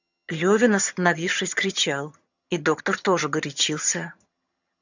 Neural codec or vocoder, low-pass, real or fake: vocoder, 22.05 kHz, 80 mel bands, HiFi-GAN; 7.2 kHz; fake